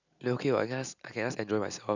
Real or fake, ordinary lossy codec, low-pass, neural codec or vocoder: real; none; 7.2 kHz; none